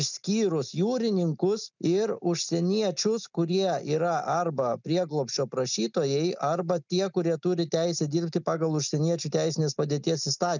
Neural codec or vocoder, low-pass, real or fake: none; 7.2 kHz; real